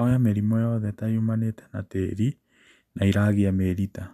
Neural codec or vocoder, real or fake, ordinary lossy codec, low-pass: none; real; none; 14.4 kHz